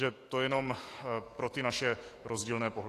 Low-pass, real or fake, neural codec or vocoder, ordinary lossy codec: 14.4 kHz; fake; autoencoder, 48 kHz, 128 numbers a frame, DAC-VAE, trained on Japanese speech; AAC, 48 kbps